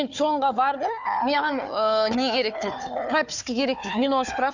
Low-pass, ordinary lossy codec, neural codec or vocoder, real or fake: 7.2 kHz; none; codec, 16 kHz, 4 kbps, FunCodec, trained on Chinese and English, 50 frames a second; fake